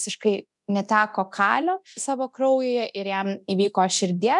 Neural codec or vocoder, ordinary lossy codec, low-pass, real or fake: codec, 24 kHz, 0.9 kbps, DualCodec; MP3, 96 kbps; 10.8 kHz; fake